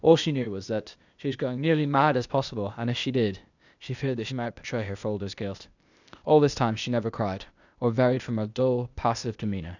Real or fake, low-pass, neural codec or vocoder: fake; 7.2 kHz; codec, 16 kHz, 0.8 kbps, ZipCodec